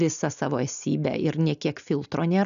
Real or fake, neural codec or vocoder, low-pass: real; none; 7.2 kHz